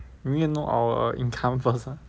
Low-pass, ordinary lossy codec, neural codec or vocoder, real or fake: none; none; none; real